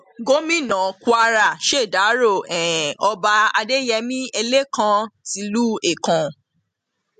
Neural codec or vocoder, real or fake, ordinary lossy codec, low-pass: none; real; MP3, 64 kbps; 14.4 kHz